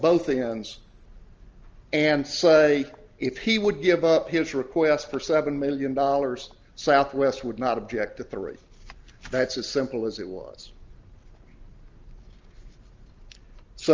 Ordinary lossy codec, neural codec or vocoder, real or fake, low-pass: Opus, 24 kbps; none; real; 7.2 kHz